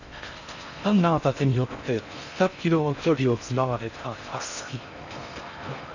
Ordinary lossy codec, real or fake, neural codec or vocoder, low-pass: none; fake; codec, 16 kHz in and 24 kHz out, 0.6 kbps, FocalCodec, streaming, 4096 codes; 7.2 kHz